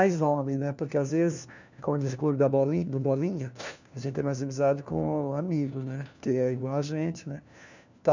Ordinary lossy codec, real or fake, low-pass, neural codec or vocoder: none; fake; 7.2 kHz; codec, 16 kHz, 1 kbps, FunCodec, trained on LibriTTS, 50 frames a second